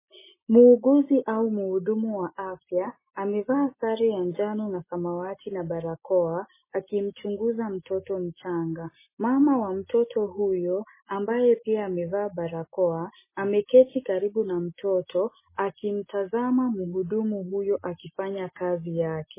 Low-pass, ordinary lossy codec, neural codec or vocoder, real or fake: 3.6 kHz; MP3, 16 kbps; none; real